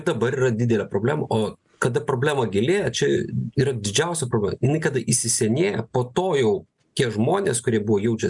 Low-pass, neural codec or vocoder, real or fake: 10.8 kHz; none; real